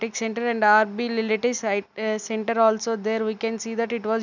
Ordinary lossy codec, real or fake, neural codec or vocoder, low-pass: none; real; none; 7.2 kHz